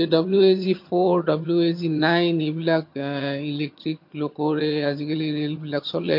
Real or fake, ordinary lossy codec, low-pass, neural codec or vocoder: fake; MP3, 32 kbps; 5.4 kHz; vocoder, 22.05 kHz, 80 mel bands, HiFi-GAN